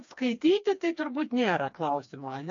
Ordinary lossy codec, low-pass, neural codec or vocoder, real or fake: MP3, 64 kbps; 7.2 kHz; codec, 16 kHz, 2 kbps, FreqCodec, smaller model; fake